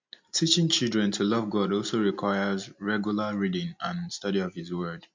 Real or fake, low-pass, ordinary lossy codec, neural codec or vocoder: real; 7.2 kHz; MP3, 48 kbps; none